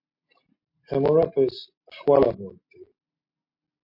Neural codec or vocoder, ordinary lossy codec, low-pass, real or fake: none; MP3, 32 kbps; 5.4 kHz; real